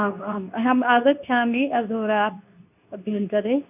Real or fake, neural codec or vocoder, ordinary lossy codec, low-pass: fake; codec, 24 kHz, 0.9 kbps, WavTokenizer, medium speech release version 2; none; 3.6 kHz